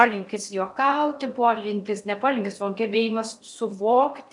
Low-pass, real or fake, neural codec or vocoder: 10.8 kHz; fake; codec, 16 kHz in and 24 kHz out, 0.6 kbps, FocalCodec, streaming, 4096 codes